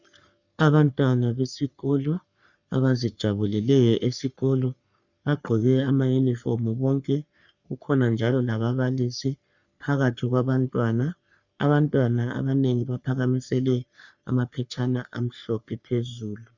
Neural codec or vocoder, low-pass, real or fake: codec, 44.1 kHz, 3.4 kbps, Pupu-Codec; 7.2 kHz; fake